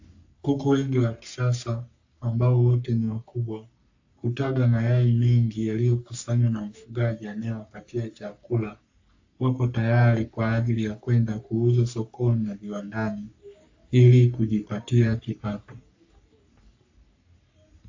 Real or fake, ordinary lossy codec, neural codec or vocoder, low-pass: fake; AAC, 48 kbps; codec, 44.1 kHz, 3.4 kbps, Pupu-Codec; 7.2 kHz